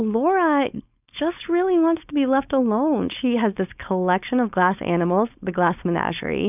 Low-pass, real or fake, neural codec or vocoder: 3.6 kHz; fake; codec, 16 kHz, 4.8 kbps, FACodec